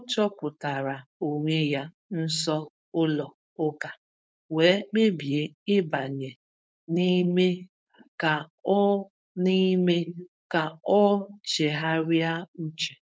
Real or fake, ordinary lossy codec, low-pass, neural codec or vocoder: fake; none; none; codec, 16 kHz, 4.8 kbps, FACodec